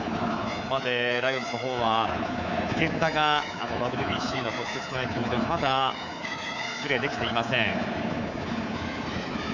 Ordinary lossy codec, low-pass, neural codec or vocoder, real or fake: none; 7.2 kHz; codec, 24 kHz, 3.1 kbps, DualCodec; fake